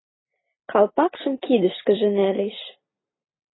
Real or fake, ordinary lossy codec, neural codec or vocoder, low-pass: real; AAC, 16 kbps; none; 7.2 kHz